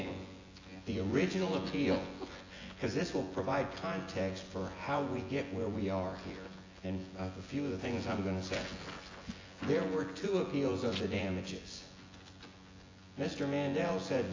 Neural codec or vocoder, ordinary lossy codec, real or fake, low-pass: vocoder, 24 kHz, 100 mel bands, Vocos; AAC, 32 kbps; fake; 7.2 kHz